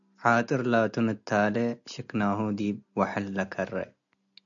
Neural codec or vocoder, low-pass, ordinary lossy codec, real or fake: none; 7.2 kHz; MP3, 96 kbps; real